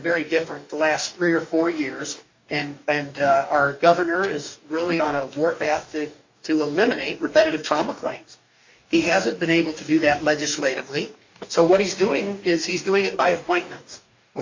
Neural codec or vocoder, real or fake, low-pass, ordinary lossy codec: codec, 44.1 kHz, 2.6 kbps, DAC; fake; 7.2 kHz; MP3, 64 kbps